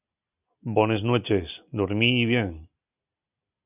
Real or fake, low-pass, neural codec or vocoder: real; 3.6 kHz; none